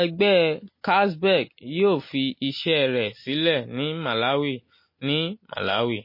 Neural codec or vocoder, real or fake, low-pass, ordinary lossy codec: none; real; 5.4 kHz; MP3, 24 kbps